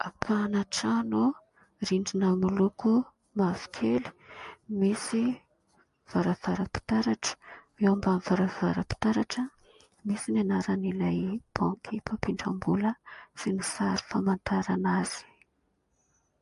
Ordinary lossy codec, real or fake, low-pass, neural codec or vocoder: MP3, 48 kbps; real; 14.4 kHz; none